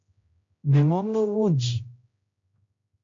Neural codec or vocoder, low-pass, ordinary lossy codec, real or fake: codec, 16 kHz, 0.5 kbps, X-Codec, HuBERT features, trained on general audio; 7.2 kHz; AAC, 48 kbps; fake